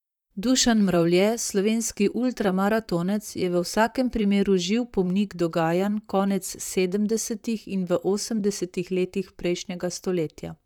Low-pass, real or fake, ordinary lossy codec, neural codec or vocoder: 19.8 kHz; fake; none; vocoder, 44.1 kHz, 128 mel bands, Pupu-Vocoder